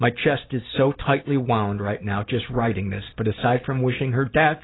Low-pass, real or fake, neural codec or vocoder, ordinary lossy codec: 7.2 kHz; real; none; AAC, 16 kbps